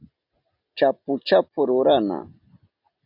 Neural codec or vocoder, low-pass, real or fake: none; 5.4 kHz; real